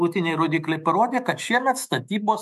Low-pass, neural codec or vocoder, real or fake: 14.4 kHz; autoencoder, 48 kHz, 128 numbers a frame, DAC-VAE, trained on Japanese speech; fake